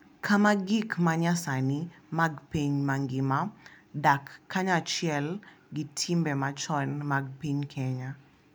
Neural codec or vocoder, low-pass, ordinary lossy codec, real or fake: none; none; none; real